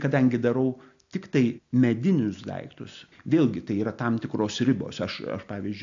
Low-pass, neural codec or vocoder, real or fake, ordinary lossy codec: 7.2 kHz; none; real; AAC, 64 kbps